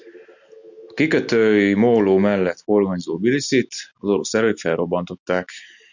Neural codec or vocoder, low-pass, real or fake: none; 7.2 kHz; real